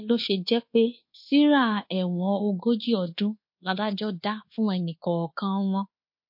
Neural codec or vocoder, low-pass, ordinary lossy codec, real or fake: codec, 24 kHz, 1.2 kbps, DualCodec; 5.4 kHz; MP3, 32 kbps; fake